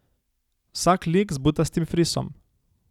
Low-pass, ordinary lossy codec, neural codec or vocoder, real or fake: 19.8 kHz; none; none; real